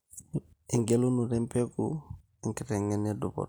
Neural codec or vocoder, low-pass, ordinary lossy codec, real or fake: none; none; none; real